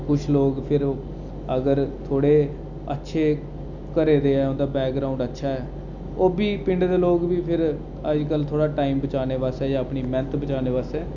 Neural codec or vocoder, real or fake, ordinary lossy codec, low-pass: none; real; none; 7.2 kHz